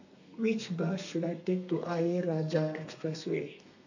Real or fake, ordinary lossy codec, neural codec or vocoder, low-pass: fake; none; codec, 32 kHz, 1.9 kbps, SNAC; 7.2 kHz